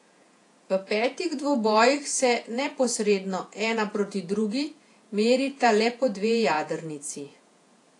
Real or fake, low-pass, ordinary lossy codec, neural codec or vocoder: fake; 10.8 kHz; AAC, 48 kbps; vocoder, 48 kHz, 128 mel bands, Vocos